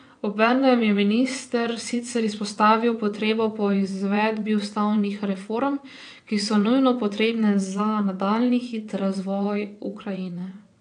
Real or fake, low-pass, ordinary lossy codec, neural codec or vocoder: fake; 9.9 kHz; AAC, 64 kbps; vocoder, 22.05 kHz, 80 mel bands, WaveNeXt